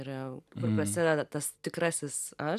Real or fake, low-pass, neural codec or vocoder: real; 14.4 kHz; none